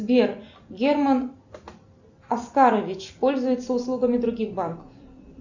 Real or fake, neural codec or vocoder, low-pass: real; none; 7.2 kHz